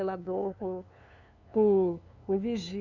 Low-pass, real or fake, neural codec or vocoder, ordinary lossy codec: 7.2 kHz; fake; codec, 16 kHz, 2 kbps, FunCodec, trained on LibriTTS, 25 frames a second; none